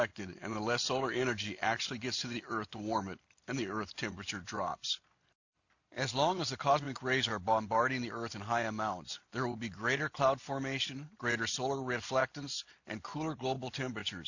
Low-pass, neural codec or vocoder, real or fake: 7.2 kHz; none; real